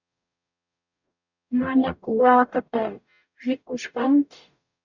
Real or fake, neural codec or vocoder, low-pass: fake; codec, 44.1 kHz, 0.9 kbps, DAC; 7.2 kHz